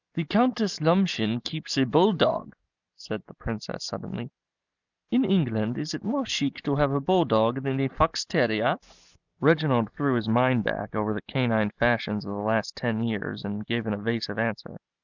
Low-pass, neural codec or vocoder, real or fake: 7.2 kHz; none; real